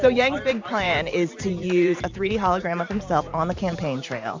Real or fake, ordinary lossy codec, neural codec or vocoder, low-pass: fake; MP3, 48 kbps; vocoder, 44.1 kHz, 128 mel bands every 512 samples, BigVGAN v2; 7.2 kHz